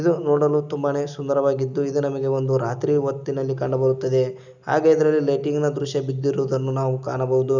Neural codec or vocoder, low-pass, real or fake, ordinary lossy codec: none; 7.2 kHz; real; none